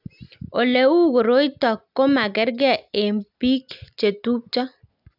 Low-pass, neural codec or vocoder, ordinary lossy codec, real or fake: 5.4 kHz; none; none; real